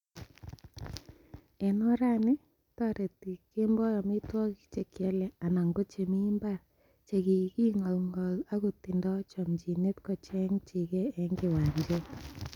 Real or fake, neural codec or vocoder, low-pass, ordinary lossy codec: real; none; 19.8 kHz; none